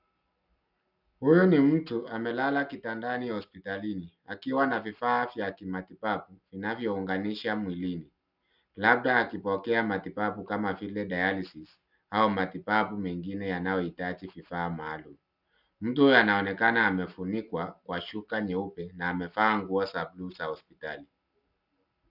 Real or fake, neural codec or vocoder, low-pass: real; none; 5.4 kHz